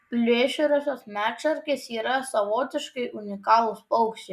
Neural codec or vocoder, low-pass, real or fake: none; 14.4 kHz; real